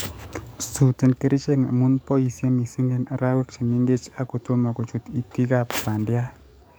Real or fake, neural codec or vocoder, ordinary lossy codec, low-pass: fake; codec, 44.1 kHz, 7.8 kbps, DAC; none; none